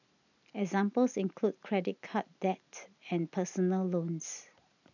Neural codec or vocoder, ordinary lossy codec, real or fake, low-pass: none; none; real; 7.2 kHz